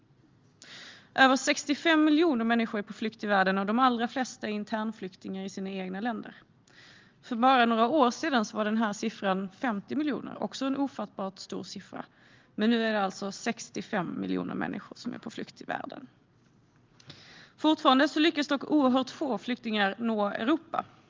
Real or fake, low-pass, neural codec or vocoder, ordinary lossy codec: real; 7.2 kHz; none; Opus, 32 kbps